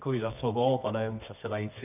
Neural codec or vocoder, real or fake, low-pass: codec, 24 kHz, 0.9 kbps, WavTokenizer, medium music audio release; fake; 3.6 kHz